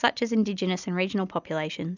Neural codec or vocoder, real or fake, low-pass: none; real; 7.2 kHz